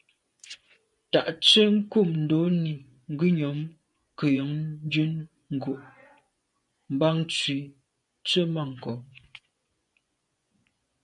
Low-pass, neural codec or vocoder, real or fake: 10.8 kHz; vocoder, 24 kHz, 100 mel bands, Vocos; fake